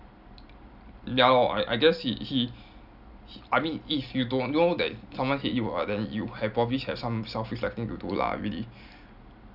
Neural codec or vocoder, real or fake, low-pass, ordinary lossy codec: none; real; 5.4 kHz; none